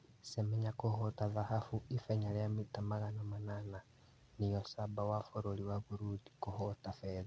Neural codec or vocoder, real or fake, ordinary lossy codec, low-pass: none; real; none; none